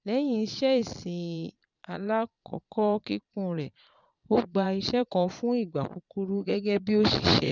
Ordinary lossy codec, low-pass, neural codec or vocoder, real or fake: none; 7.2 kHz; vocoder, 22.05 kHz, 80 mel bands, Vocos; fake